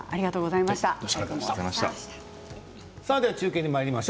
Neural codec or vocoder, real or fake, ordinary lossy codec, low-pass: none; real; none; none